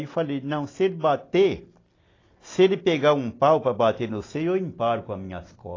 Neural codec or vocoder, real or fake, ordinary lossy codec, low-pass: none; real; AAC, 32 kbps; 7.2 kHz